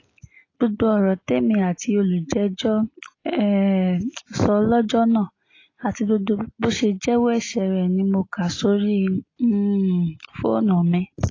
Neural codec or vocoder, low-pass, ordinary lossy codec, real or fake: none; 7.2 kHz; AAC, 32 kbps; real